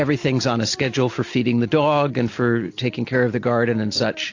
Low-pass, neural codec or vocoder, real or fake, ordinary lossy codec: 7.2 kHz; none; real; AAC, 48 kbps